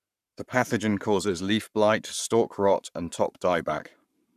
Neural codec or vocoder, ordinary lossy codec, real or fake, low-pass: codec, 44.1 kHz, 7.8 kbps, Pupu-Codec; none; fake; 14.4 kHz